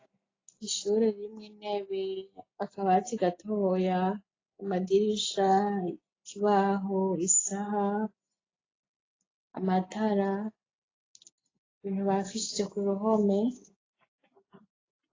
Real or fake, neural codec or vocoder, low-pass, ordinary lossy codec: real; none; 7.2 kHz; AAC, 32 kbps